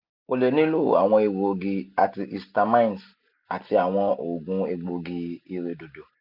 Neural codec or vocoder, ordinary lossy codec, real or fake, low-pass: none; none; real; 5.4 kHz